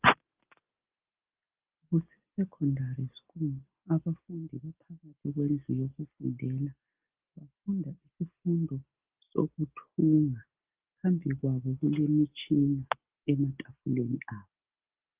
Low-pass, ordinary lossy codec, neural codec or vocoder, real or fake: 3.6 kHz; Opus, 32 kbps; none; real